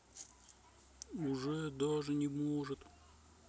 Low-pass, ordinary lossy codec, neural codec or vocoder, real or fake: none; none; none; real